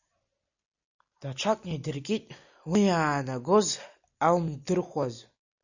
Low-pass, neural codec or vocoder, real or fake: 7.2 kHz; none; real